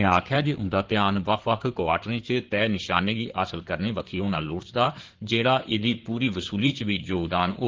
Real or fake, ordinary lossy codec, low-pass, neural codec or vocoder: fake; Opus, 16 kbps; 7.2 kHz; codec, 16 kHz in and 24 kHz out, 2.2 kbps, FireRedTTS-2 codec